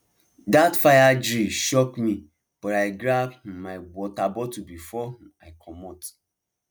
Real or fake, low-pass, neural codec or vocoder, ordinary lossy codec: real; none; none; none